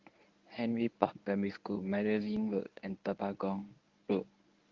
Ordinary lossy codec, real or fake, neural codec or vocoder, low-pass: Opus, 24 kbps; fake; codec, 24 kHz, 0.9 kbps, WavTokenizer, medium speech release version 1; 7.2 kHz